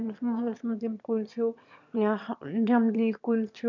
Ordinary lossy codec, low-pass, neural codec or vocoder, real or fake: none; 7.2 kHz; autoencoder, 22.05 kHz, a latent of 192 numbers a frame, VITS, trained on one speaker; fake